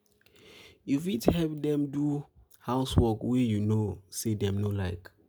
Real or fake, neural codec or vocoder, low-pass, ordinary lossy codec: real; none; none; none